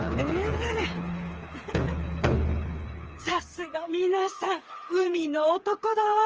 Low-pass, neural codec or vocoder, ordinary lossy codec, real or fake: 7.2 kHz; codec, 16 kHz, 4 kbps, FreqCodec, smaller model; Opus, 24 kbps; fake